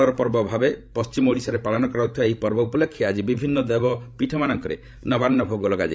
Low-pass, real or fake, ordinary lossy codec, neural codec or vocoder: none; fake; none; codec, 16 kHz, 16 kbps, FreqCodec, larger model